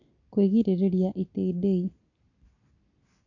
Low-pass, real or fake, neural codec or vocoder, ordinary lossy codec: 7.2 kHz; real; none; none